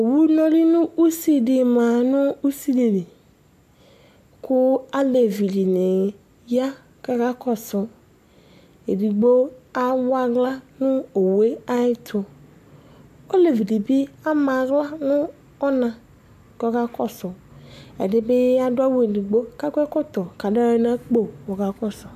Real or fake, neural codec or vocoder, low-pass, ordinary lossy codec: real; none; 14.4 kHz; MP3, 96 kbps